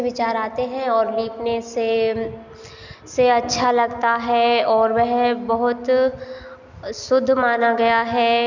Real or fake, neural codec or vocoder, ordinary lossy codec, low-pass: real; none; none; 7.2 kHz